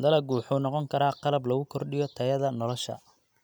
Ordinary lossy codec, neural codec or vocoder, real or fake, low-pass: none; none; real; none